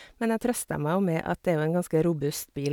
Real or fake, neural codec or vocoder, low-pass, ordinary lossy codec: real; none; none; none